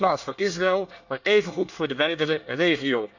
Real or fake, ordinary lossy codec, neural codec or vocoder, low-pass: fake; none; codec, 24 kHz, 1 kbps, SNAC; 7.2 kHz